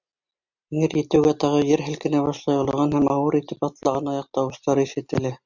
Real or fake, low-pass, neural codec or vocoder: real; 7.2 kHz; none